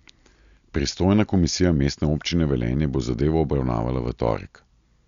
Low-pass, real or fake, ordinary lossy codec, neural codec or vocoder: 7.2 kHz; real; Opus, 64 kbps; none